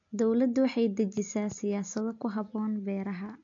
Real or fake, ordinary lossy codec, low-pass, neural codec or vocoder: real; MP3, 48 kbps; 7.2 kHz; none